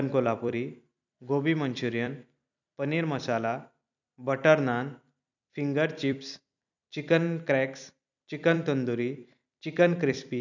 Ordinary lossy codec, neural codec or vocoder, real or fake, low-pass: none; vocoder, 44.1 kHz, 128 mel bands every 256 samples, BigVGAN v2; fake; 7.2 kHz